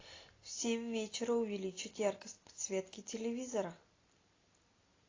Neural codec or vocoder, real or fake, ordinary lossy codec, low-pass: none; real; AAC, 32 kbps; 7.2 kHz